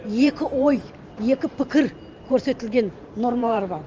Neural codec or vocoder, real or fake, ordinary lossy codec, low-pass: vocoder, 44.1 kHz, 128 mel bands every 512 samples, BigVGAN v2; fake; Opus, 24 kbps; 7.2 kHz